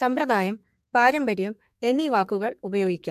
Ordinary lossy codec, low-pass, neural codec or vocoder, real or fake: AAC, 96 kbps; 14.4 kHz; codec, 32 kHz, 1.9 kbps, SNAC; fake